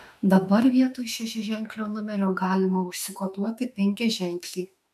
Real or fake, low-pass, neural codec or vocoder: fake; 14.4 kHz; autoencoder, 48 kHz, 32 numbers a frame, DAC-VAE, trained on Japanese speech